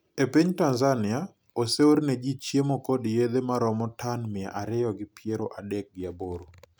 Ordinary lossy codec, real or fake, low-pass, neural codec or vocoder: none; real; none; none